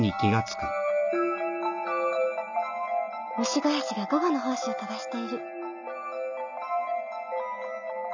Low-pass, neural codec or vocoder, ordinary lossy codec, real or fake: 7.2 kHz; none; none; real